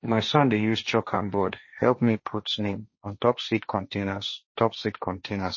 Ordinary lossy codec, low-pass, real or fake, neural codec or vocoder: MP3, 32 kbps; 7.2 kHz; fake; codec, 16 kHz, 1.1 kbps, Voila-Tokenizer